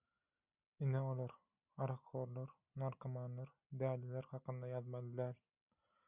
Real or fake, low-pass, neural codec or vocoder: real; 3.6 kHz; none